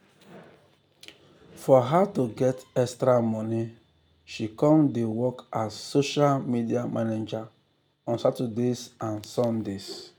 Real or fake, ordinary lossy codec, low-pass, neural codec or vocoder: real; none; 19.8 kHz; none